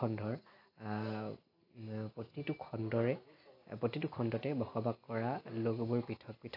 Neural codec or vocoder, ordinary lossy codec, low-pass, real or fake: none; AAC, 32 kbps; 5.4 kHz; real